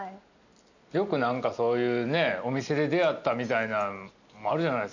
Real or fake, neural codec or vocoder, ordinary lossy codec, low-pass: real; none; none; 7.2 kHz